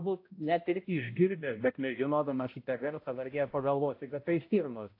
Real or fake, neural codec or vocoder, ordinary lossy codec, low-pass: fake; codec, 16 kHz, 0.5 kbps, X-Codec, HuBERT features, trained on balanced general audio; AAC, 32 kbps; 5.4 kHz